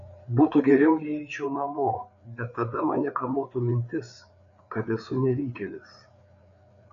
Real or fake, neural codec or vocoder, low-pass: fake; codec, 16 kHz, 4 kbps, FreqCodec, larger model; 7.2 kHz